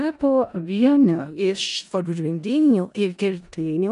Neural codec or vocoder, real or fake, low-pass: codec, 16 kHz in and 24 kHz out, 0.4 kbps, LongCat-Audio-Codec, four codebook decoder; fake; 10.8 kHz